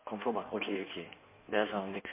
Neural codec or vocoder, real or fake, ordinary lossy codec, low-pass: codec, 16 kHz in and 24 kHz out, 1.1 kbps, FireRedTTS-2 codec; fake; MP3, 24 kbps; 3.6 kHz